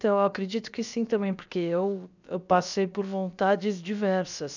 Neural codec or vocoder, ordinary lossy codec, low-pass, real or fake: codec, 16 kHz, about 1 kbps, DyCAST, with the encoder's durations; none; 7.2 kHz; fake